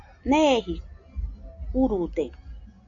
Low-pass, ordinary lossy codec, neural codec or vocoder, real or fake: 7.2 kHz; AAC, 64 kbps; none; real